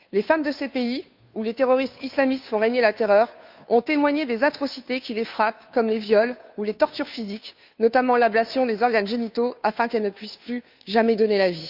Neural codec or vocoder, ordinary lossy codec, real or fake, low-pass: codec, 16 kHz, 2 kbps, FunCodec, trained on Chinese and English, 25 frames a second; none; fake; 5.4 kHz